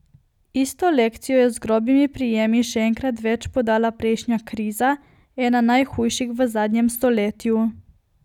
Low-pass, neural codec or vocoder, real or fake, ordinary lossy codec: 19.8 kHz; none; real; none